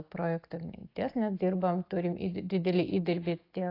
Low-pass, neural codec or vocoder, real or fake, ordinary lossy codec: 5.4 kHz; none; real; AAC, 32 kbps